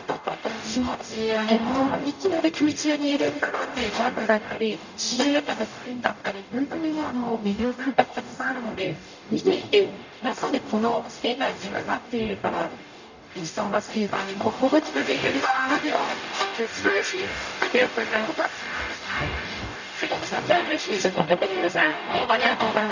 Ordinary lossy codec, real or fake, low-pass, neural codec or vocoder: none; fake; 7.2 kHz; codec, 44.1 kHz, 0.9 kbps, DAC